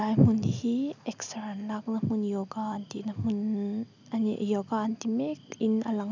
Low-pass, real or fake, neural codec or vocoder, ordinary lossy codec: 7.2 kHz; real; none; none